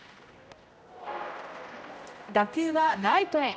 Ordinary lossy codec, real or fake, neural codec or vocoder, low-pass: none; fake; codec, 16 kHz, 0.5 kbps, X-Codec, HuBERT features, trained on general audio; none